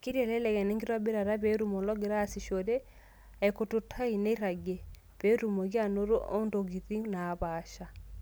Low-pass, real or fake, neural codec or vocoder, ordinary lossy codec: none; real; none; none